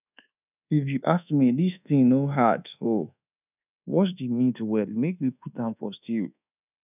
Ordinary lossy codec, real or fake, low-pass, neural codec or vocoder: none; fake; 3.6 kHz; codec, 24 kHz, 1.2 kbps, DualCodec